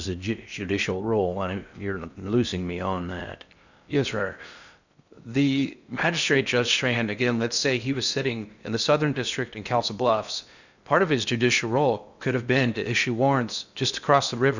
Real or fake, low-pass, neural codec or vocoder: fake; 7.2 kHz; codec, 16 kHz in and 24 kHz out, 0.6 kbps, FocalCodec, streaming, 2048 codes